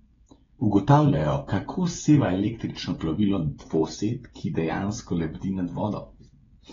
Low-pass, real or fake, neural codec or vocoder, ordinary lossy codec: 7.2 kHz; fake; codec, 16 kHz, 16 kbps, FreqCodec, smaller model; AAC, 24 kbps